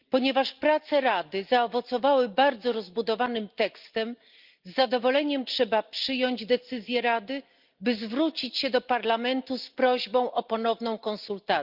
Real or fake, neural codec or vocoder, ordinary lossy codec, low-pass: real; none; Opus, 24 kbps; 5.4 kHz